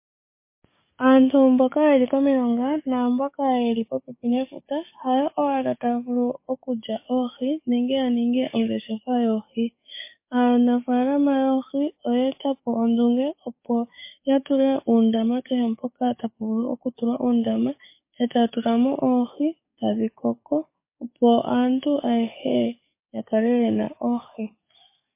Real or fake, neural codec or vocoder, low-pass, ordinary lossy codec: fake; codec, 44.1 kHz, 7.8 kbps, DAC; 3.6 kHz; MP3, 24 kbps